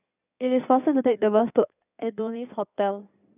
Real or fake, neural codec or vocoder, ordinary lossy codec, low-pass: fake; codec, 16 kHz in and 24 kHz out, 2.2 kbps, FireRedTTS-2 codec; none; 3.6 kHz